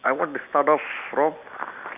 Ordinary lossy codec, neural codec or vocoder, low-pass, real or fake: none; none; 3.6 kHz; real